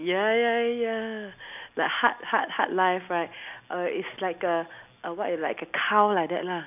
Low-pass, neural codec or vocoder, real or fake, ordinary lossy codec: 3.6 kHz; none; real; none